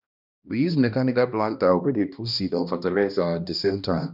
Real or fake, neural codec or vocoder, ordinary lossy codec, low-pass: fake; codec, 16 kHz, 1 kbps, X-Codec, HuBERT features, trained on balanced general audio; none; 5.4 kHz